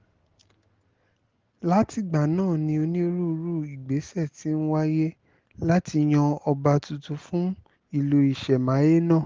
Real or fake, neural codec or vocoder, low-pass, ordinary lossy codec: real; none; 7.2 kHz; Opus, 16 kbps